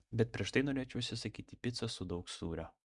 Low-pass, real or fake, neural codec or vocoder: 10.8 kHz; real; none